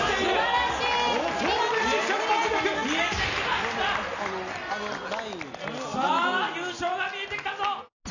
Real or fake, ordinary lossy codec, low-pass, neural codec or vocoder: real; none; 7.2 kHz; none